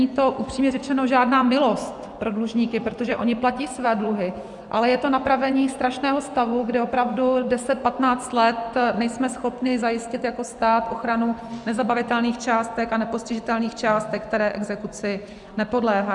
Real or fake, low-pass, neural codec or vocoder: fake; 10.8 kHz; vocoder, 24 kHz, 100 mel bands, Vocos